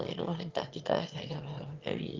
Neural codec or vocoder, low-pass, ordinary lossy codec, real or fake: autoencoder, 22.05 kHz, a latent of 192 numbers a frame, VITS, trained on one speaker; 7.2 kHz; Opus, 32 kbps; fake